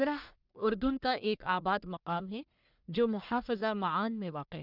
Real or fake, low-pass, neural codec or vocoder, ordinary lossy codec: fake; 5.4 kHz; codec, 44.1 kHz, 1.7 kbps, Pupu-Codec; none